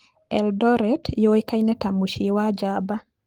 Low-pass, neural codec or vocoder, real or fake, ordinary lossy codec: 19.8 kHz; codec, 44.1 kHz, 7.8 kbps, Pupu-Codec; fake; Opus, 24 kbps